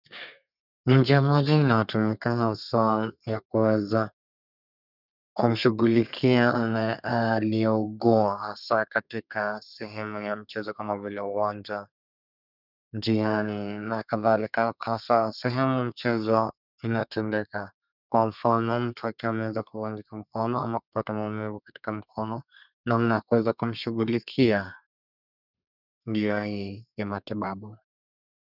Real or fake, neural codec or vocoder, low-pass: fake; codec, 32 kHz, 1.9 kbps, SNAC; 5.4 kHz